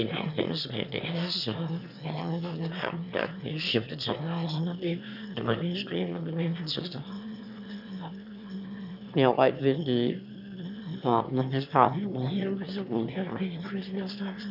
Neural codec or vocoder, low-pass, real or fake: autoencoder, 22.05 kHz, a latent of 192 numbers a frame, VITS, trained on one speaker; 5.4 kHz; fake